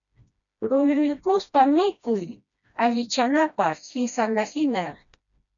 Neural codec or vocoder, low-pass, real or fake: codec, 16 kHz, 1 kbps, FreqCodec, smaller model; 7.2 kHz; fake